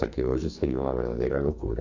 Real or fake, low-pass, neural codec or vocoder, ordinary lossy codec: fake; 7.2 kHz; codec, 44.1 kHz, 2.6 kbps, SNAC; AAC, 48 kbps